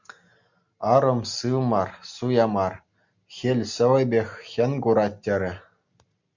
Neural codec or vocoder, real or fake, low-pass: none; real; 7.2 kHz